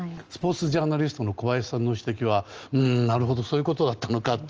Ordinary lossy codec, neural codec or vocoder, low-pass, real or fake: Opus, 24 kbps; none; 7.2 kHz; real